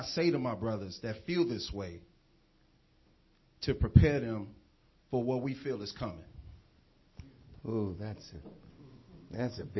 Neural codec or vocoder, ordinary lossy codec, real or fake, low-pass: none; MP3, 24 kbps; real; 7.2 kHz